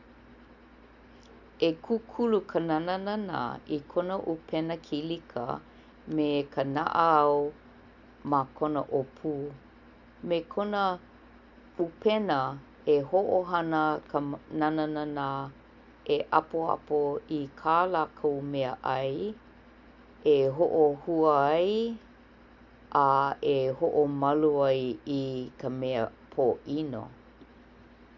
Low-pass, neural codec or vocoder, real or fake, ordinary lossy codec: 7.2 kHz; none; real; none